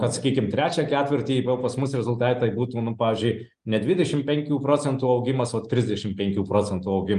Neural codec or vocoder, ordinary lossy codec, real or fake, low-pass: none; Opus, 32 kbps; real; 10.8 kHz